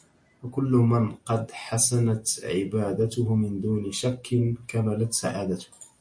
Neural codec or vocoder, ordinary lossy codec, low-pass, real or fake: none; MP3, 48 kbps; 9.9 kHz; real